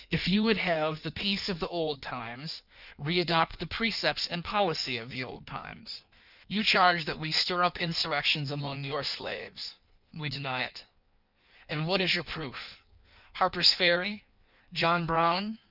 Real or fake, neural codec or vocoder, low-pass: fake; codec, 16 kHz in and 24 kHz out, 1.1 kbps, FireRedTTS-2 codec; 5.4 kHz